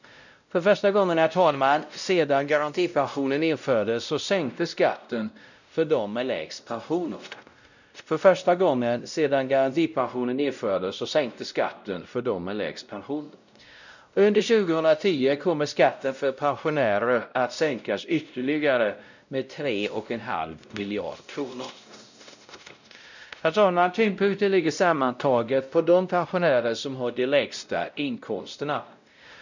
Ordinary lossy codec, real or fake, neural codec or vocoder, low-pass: none; fake; codec, 16 kHz, 0.5 kbps, X-Codec, WavLM features, trained on Multilingual LibriSpeech; 7.2 kHz